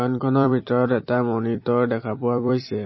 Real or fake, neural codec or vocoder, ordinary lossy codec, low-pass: fake; vocoder, 44.1 kHz, 128 mel bands every 256 samples, BigVGAN v2; MP3, 24 kbps; 7.2 kHz